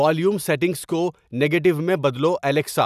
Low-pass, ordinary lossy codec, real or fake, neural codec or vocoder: 14.4 kHz; none; real; none